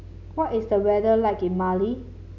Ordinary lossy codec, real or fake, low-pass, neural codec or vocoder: none; real; 7.2 kHz; none